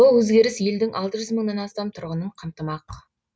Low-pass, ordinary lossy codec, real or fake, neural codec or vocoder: none; none; real; none